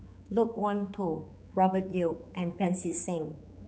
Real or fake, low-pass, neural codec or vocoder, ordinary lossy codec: fake; none; codec, 16 kHz, 4 kbps, X-Codec, HuBERT features, trained on general audio; none